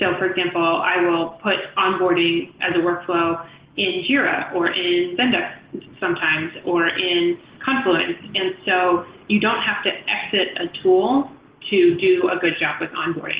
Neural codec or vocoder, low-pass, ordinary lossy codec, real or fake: none; 3.6 kHz; Opus, 16 kbps; real